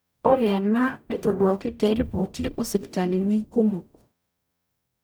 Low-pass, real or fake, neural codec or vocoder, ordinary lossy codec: none; fake; codec, 44.1 kHz, 0.9 kbps, DAC; none